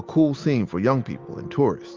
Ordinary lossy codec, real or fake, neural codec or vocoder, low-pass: Opus, 32 kbps; real; none; 7.2 kHz